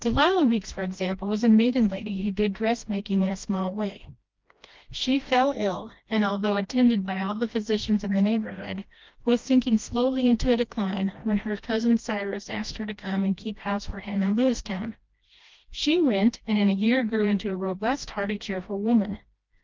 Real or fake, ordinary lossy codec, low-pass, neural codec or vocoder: fake; Opus, 24 kbps; 7.2 kHz; codec, 16 kHz, 1 kbps, FreqCodec, smaller model